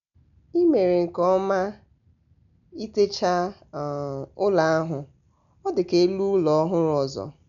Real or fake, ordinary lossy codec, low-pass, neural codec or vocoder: real; none; 7.2 kHz; none